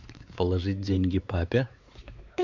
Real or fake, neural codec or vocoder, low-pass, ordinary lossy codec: fake; codec, 16 kHz, 4 kbps, X-Codec, WavLM features, trained on Multilingual LibriSpeech; 7.2 kHz; Opus, 64 kbps